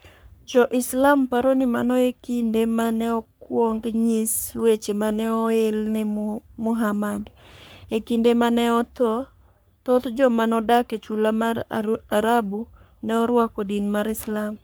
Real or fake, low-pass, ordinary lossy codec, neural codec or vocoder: fake; none; none; codec, 44.1 kHz, 3.4 kbps, Pupu-Codec